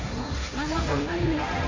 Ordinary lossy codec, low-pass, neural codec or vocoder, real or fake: AAC, 48 kbps; 7.2 kHz; codec, 16 kHz, 1.1 kbps, Voila-Tokenizer; fake